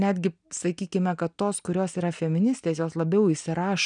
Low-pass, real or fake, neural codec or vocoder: 9.9 kHz; real; none